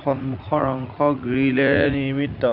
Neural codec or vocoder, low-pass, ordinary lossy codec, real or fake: vocoder, 44.1 kHz, 80 mel bands, Vocos; 5.4 kHz; none; fake